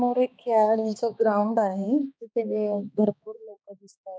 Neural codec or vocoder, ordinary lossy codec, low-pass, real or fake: codec, 16 kHz, 2 kbps, X-Codec, HuBERT features, trained on balanced general audio; none; none; fake